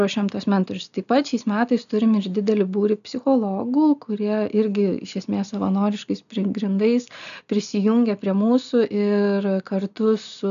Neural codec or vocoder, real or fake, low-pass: none; real; 7.2 kHz